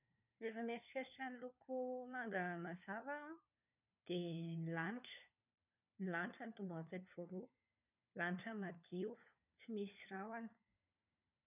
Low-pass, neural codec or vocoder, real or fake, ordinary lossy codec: 3.6 kHz; codec, 16 kHz, 4 kbps, FunCodec, trained on LibriTTS, 50 frames a second; fake; none